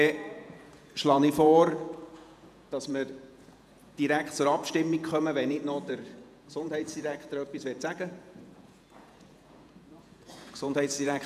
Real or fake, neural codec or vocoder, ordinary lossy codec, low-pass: fake; vocoder, 48 kHz, 128 mel bands, Vocos; none; 14.4 kHz